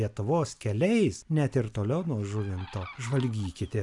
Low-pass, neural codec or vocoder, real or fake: 10.8 kHz; none; real